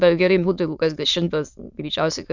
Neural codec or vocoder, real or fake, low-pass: autoencoder, 22.05 kHz, a latent of 192 numbers a frame, VITS, trained on many speakers; fake; 7.2 kHz